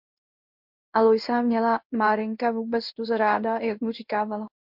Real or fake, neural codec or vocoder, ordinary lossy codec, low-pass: fake; codec, 16 kHz in and 24 kHz out, 1 kbps, XY-Tokenizer; MP3, 48 kbps; 5.4 kHz